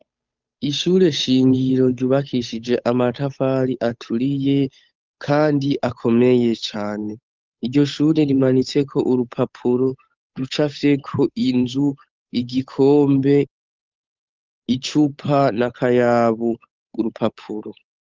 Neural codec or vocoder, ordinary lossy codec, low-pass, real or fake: codec, 16 kHz, 8 kbps, FunCodec, trained on Chinese and English, 25 frames a second; Opus, 24 kbps; 7.2 kHz; fake